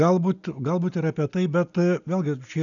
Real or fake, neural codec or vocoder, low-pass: real; none; 7.2 kHz